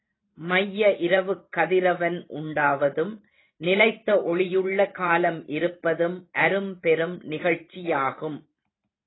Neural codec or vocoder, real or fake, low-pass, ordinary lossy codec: vocoder, 44.1 kHz, 128 mel bands every 512 samples, BigVGAN v2; fake; 7.2 kHz; AAC, 16 kbps